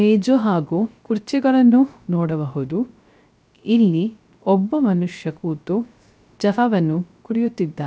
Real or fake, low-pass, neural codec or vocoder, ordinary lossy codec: fake; none; codec, 16 kHz, 0.3 kbps, FocalCodec; none